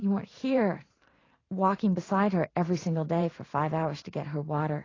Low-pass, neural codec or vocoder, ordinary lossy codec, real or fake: 7.2 kHz; vocoder, 44.1 kHz, 128 mel bands every 512 samples, BigVGAN v2; AAC, 32 kbps; fake